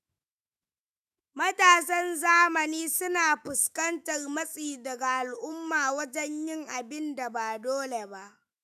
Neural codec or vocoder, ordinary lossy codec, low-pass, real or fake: autoencoder, 48 kHz, 128 numbers a frame, DAC-VAE, trained on Japanese speech; none; 14.4 kHz; fake